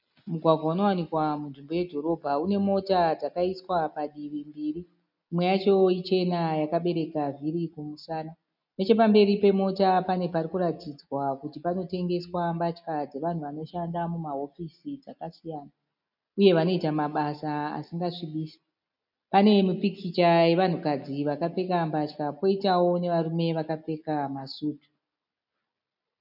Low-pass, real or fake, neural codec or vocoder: 5.4 kHz; real; none